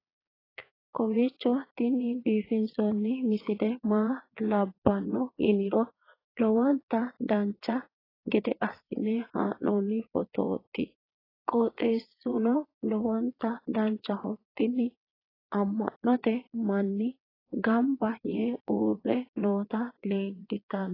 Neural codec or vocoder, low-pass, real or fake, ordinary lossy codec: vocoder, 22.05 kHz, 80 mel bands, Vocos; 5.4 kHz; fake; AAC, 24 kbps